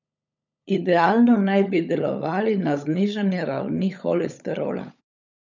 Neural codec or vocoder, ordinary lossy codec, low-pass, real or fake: codec, 16 kHz, 16 kbps, FunCodec, trained on LibriTTS, 50 frames a second; none; 7.2 kHz; fake